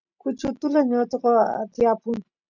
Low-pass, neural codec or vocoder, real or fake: 7.2 kHz; none; real